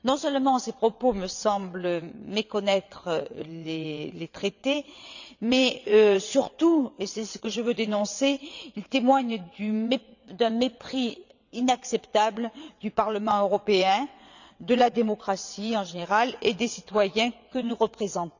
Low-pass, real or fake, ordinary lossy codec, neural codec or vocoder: 7.2 kHz; fake; none; vocoder, 22.05 kHz, 80 mel bands, WaveNeXt